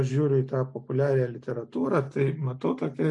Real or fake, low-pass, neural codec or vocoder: real; 10.8 kHz; none